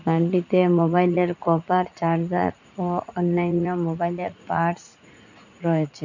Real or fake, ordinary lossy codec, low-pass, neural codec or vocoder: fake; none; 7.2 kHz; vocoder, 44.1 kHz, 80 mel bands, Vocos